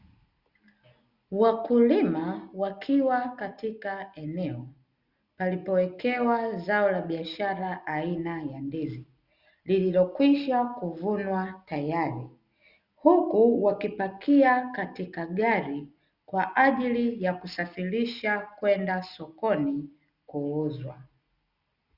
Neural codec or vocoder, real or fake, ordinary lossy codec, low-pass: none; real; Opus, 64 kbps; 5.4 kHz